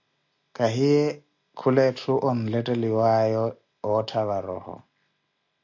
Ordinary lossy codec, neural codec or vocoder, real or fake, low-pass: AAC, 48 kbps; none; real; 7.2 kHz